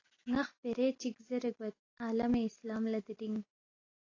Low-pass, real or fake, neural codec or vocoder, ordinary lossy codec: 7.2 kHz; real; none; AAC, 32 kbps